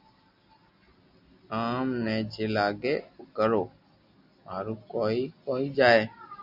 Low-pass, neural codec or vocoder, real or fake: 5.4 kHz; none; real